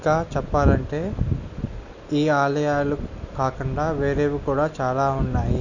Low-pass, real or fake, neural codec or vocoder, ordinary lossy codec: 7.2 kHz; real; none; none